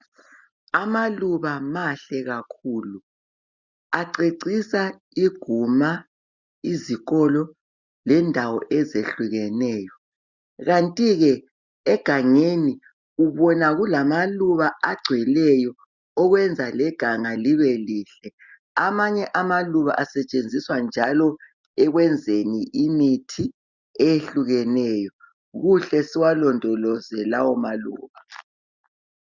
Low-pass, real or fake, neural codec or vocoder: 7.2 kHz; real; none